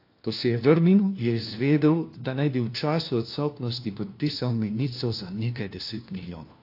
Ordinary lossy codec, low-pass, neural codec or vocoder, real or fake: AAC, 32 kbps; 5.4 kHz; codec, 16 kHz, 1 kbps, FunCodec, trained on LibriTTS, 50 frames a second; fake